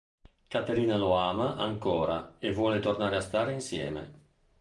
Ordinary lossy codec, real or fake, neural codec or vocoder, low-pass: Opus, 32 kbps; real; none; 10.8 kHz